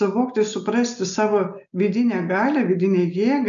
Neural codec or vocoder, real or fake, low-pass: none; real; 7.2 kHz